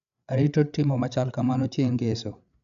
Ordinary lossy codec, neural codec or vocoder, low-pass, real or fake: none; codec, 16 kHz, 8 kbps, FreqCodec, larger model; 7.2 kHz; fake